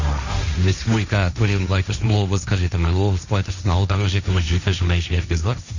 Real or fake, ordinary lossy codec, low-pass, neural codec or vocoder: fake; none; 7.2 kHz; codec, 16 kHz, 1.1 kbps, Voila-Tokenizer